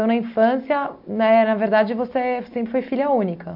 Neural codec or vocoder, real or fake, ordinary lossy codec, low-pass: none; real; MP3, 48 kbps; 5.4 kHz